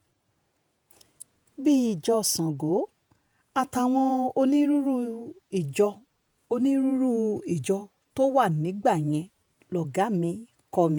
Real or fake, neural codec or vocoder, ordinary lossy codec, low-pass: fake; vocoder, 48 kHz, 128 mel bands, Vocos; none; none